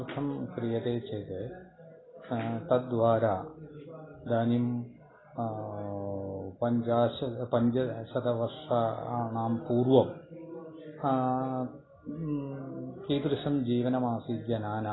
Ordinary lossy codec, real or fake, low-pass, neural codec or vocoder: AAC, 16 kbps; real; 7.2 kHz; none